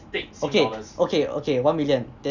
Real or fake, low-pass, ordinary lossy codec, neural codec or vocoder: real; 7.2 kHz; none; none